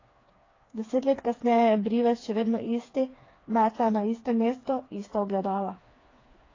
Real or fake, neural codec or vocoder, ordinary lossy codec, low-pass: fake; codec, 16 kHz, 4 kbps, FreqCodec, smaller model; AAC, 32 kbps; 7.2 kHz